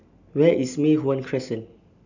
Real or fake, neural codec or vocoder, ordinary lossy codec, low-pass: real; none; none; 7.2 kHz